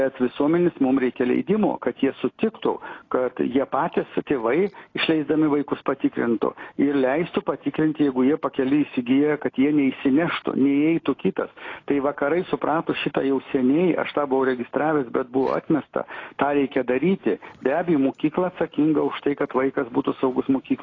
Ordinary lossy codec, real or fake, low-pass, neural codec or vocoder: AAC, 32 kbps; real; 7.2 kHz; none